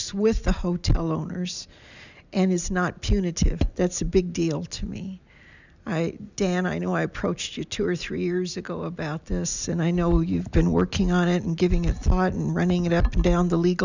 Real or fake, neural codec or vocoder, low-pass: real; none; 7.2 kHz